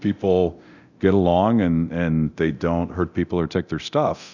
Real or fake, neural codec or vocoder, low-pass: fake; codec, 24 kHz, 0.9 kbps, DualCodec; 7.2 kHz